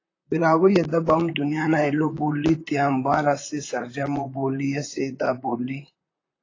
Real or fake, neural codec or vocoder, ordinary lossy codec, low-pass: fake; vocoder, 44.1 kHz, 128 mel bands, Pupu-Vocoder; AAC, 32 kbps; 7.2 kHz